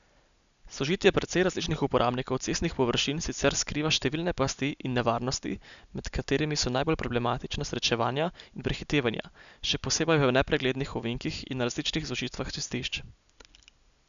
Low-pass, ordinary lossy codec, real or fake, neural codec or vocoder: 7.2 kHz; none; real; none